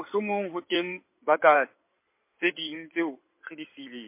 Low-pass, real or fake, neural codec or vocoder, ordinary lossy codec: 3.6 kHz; fake; codec, 16 kHz in and 24 kHz out, 2.2 kbps, FireRedTTS-2 codec; MP3, 24 kbps